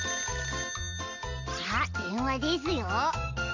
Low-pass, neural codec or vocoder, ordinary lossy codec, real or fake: 7.2 kHz; none; MP3, 48 kbps; real